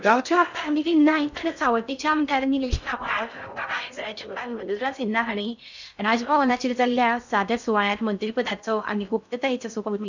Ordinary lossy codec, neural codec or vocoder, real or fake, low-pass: none; codec, 16 kHz in and 24 kHz out, 0.6 kbps, FocalCodec, streaming, 2048 codes; fake; 7.2 kHz